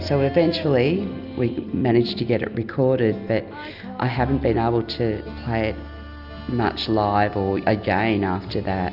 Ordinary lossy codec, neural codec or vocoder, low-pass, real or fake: Opus, 64 kbps; none; 5.4 kHz; real